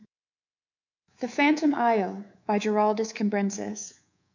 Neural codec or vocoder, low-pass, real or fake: codec, 24 kHz, 3.1 kbps, DualCodec; 7.2 kHz; fake